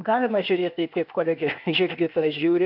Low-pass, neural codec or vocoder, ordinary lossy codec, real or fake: 5.4 kHz; codec, 16 kHz, 0.8 kbps, ZipCodec; MP3, 48 kbps; fake